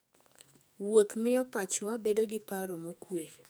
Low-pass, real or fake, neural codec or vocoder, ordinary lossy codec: none; fake; codec, 44.1 kHz, 2.6 kbps, SNAC; none